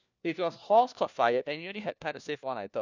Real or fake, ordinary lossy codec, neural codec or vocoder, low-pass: fake; none; codec, 16 kHz, 1 kbps, FunCodec, trained on LibriTTS, 50 frames a second; 7.2 kHz